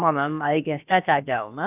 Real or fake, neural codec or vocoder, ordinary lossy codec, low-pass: fake; codec, 16 kHz, about 1 kbps, DyCAST, with the encoder's durations; none; 3.6 kHz